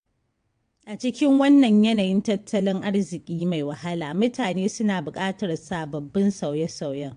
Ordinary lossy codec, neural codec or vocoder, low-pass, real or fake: AAC, 64 kbps; vocoder, 22.05 kHz, 80 mel bands, WaveNeXt; 9.9 kHz; fake